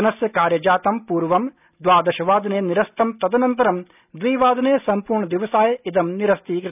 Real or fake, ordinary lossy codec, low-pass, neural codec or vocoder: real; none; 3.6 kHz; none